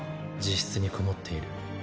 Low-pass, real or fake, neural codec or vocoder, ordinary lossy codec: none; real; none; none